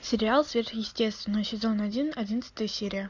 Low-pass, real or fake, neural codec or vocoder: 7.2 kHz; real; none